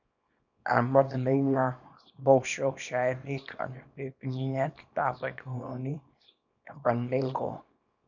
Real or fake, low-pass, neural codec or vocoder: fake; 7.2 kHz; codec, 24 kHz, 0.9 kbps, WavTokenizer, small release